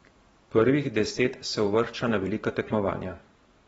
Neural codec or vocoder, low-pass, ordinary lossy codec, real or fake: vocoder, 44.1 kHz, 128 mel bands every 512 samples, BigVGAN v2; 19.8 kHz; AAC, 24 kbps; fake